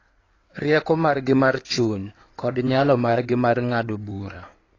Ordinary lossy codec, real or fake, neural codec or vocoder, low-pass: AAC, 32 kbps; fake; codec, 16 kHz in and 24 kHz out, 2.2 kbps, FireRedTTS-2 codec; 7.2 kHz